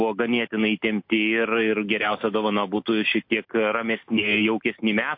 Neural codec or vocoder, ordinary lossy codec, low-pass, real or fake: none; MP3, 32 kbps; 5.4 kHz; real